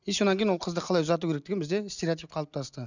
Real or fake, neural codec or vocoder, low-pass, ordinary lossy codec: real; none; 7.2 kHz; none